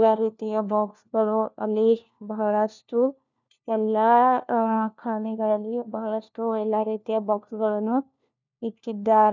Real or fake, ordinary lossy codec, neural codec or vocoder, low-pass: fake; none; codec, 16 kHz, 1 kbps, FunCodec, trained on LibriTTS, 50 frames a second; 7.2 kHz